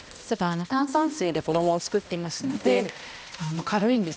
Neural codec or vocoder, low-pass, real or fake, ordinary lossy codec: codec, 16 kHz, 1 kbps, X-Codec, HuBERT features, trained on balanced general audio; none; fake; none